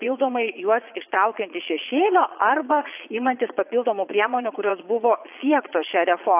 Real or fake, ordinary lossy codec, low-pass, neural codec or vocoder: fake; AAC, 32 kbps; 3.6 kHz; vocoder, 22.05 kHz, 80 mel bands, Vocos